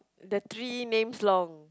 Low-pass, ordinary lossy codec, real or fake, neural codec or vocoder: none; none; real; none